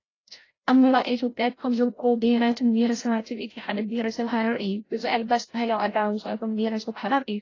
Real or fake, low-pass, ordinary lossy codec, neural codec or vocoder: fake; 7.2 kHz; AAC, 32 kbps; codec, 16 kHz, 0.5 kbps, FreqCodec, larger model